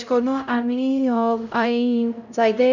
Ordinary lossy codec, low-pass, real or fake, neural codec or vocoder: none; 7.2 kHz; fake; codec, 16 kHz, 0.5 kbps, X-Codec, HuBERT features, trained on LibriSpeech